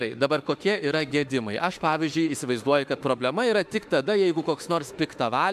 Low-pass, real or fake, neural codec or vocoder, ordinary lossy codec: 14.4 kHz; fake; autoencoder, 48 kHz, 32 numbers a frame, DAC-VAE, trained on Japanese speech; AAC, 96 kbps